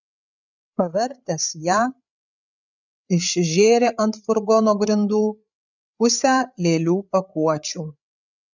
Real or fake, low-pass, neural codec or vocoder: fake; 7.2 kHz; codec, 16 kHz, 8 kbps, FreqCodec, larger model